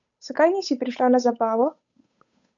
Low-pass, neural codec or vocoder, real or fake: 7.2 kHz; codec, 16 kHz, 8 kbps, FunCodec, trained on Chinese and English, 25 frames a second; fake